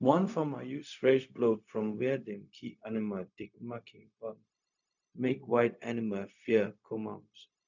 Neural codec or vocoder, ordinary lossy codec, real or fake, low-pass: codec, 16 kHz, 0.4 kbps, LongCat-Audio-Codec; none; fake; 7.2 kHz